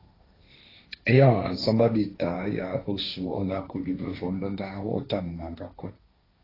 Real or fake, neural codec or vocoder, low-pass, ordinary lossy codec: fake; codec, 16 kHz, 1.1 kbps, Voila-Tokenizer; 5.4 kHz; AAC, 24 kbps